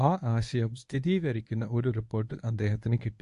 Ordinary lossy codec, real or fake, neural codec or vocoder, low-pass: AAC, 64 kbps; fake; codec, 24 kHz, 0.9 kbps, WavTokenizer, medium speech release version 1; 10.8 kHz